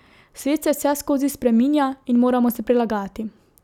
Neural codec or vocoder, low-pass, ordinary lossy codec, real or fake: none; 19.8 kHz; none; real